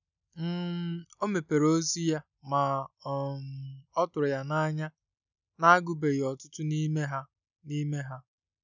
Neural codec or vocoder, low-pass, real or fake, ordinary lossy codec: none; 7.2 kHz; real; none